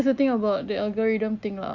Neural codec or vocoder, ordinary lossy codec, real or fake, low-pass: none; none; real; 7.2 kHz